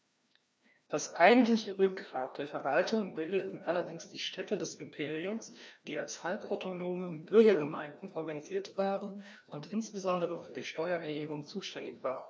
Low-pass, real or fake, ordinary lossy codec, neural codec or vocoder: none; fake; none; codec, 16 kHz, 1 kbps, FreqCodec, larger model